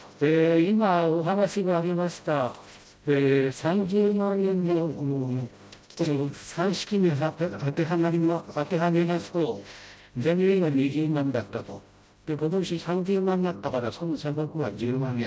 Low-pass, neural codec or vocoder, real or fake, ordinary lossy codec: none; codec, 16 kHz, 0.5 kbps, FreqCodec, smaller model; fake; none